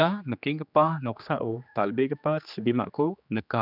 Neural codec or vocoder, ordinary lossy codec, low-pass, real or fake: codec, 16 kHz, 2 kbps, X-Codec, HuBERT features, trained on general audio; none; 5.4 kHz; fake